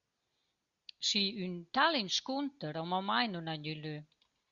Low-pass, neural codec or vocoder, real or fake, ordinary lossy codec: 7.2 kHz; none; real; Opus, 64 kbps